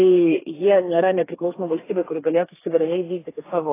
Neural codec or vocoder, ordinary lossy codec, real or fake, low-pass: codec, 32 kHz, 1.9 kbps, SNAC; AAC, 16 kbps; fake; 3.6 kHz